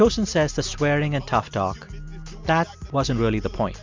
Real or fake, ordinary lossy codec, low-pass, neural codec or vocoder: real; MP3, 64 kbps; 7.2 kHz; none